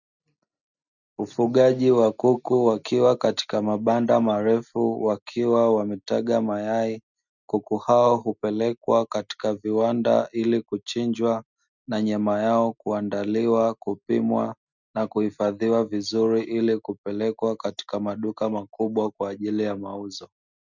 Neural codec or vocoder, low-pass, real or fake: none; 7.2 kHz; real